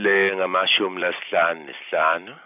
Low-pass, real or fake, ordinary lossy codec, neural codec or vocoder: 3.6 kHz; fake; none; vocoder, 44.1 kHz, 128 mel bands every 256 samples, BigVGAN v2